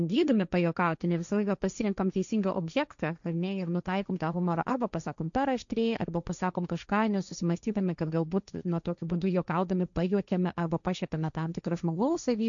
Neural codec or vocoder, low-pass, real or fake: codec, 16 kHz, 1.1 kbps, Voila-Tokenizer; 7.2 kHz; fake